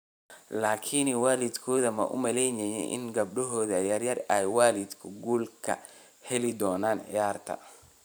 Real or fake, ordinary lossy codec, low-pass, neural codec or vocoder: fake; none; none; vocoder, 44.1 kHz, 128 mel bands every 512 samples, BigVGAN v2